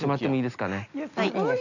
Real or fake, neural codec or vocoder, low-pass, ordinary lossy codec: real; none; 7.2 kHz; none